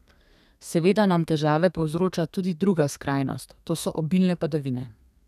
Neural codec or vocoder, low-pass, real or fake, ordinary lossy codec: codec, 32 kHz, 1.9 kbps, SNAC; 14.4 kHz; fake; none